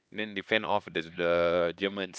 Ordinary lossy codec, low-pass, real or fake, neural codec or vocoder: none; none; fake; codec, 16 kHz, 2 kbps, X-Codec, HuBERT features, trained on LibriSpeech